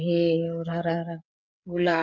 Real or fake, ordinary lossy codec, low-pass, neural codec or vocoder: fake; none; 7.2 kHz; codec, 16 kHz, 8 kbps, FreqCodec, smaller model